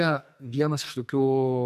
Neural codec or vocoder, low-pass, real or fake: codec, 44.1 kHz, 2.6 kbps, SNAC; 14.4 kHz; fake